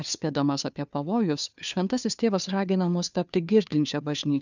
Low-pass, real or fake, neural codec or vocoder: 7.2 kHz; fake; codec, 16 kHz, 2 kbps, FunCodec, trained on Chinese and English, 25 frames a second